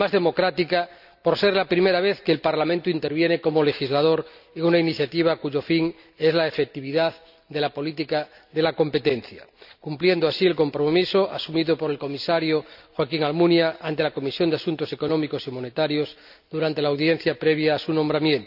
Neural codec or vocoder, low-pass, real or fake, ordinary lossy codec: none; 5.4 kHz; real; none